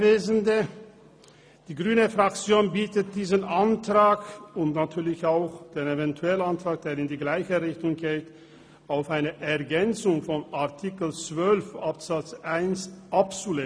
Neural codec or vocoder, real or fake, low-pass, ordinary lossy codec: none; real; none; none